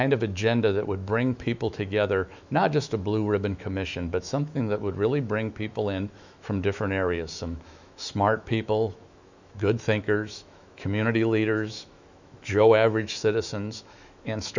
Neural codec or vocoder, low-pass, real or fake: autoencoder, 48 kHz, 128 numbers a frame, DAC-VAE, trained on Japanese speech; 7.2 kHz; fake